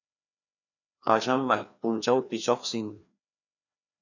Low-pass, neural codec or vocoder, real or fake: 7.2 kHz; codec, 16 kHz, 2 kbps, FreqCodec, larger model; fake